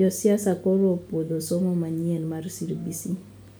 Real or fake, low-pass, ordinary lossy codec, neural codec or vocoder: real; none; none; none